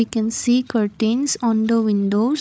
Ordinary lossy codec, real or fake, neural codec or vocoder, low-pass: none; fake; codec, 16 kHz, 16 kbps, FreqCodec, larger model; none